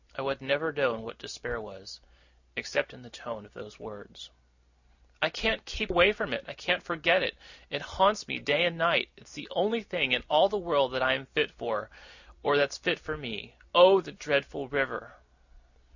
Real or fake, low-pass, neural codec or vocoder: real; 7.2 kHz; none